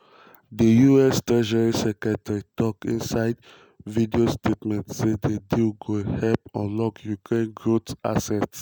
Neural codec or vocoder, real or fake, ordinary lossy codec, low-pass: none; real; none; none